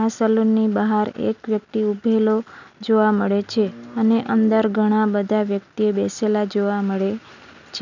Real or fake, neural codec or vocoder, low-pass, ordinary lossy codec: real; none; 7.2 kHz; none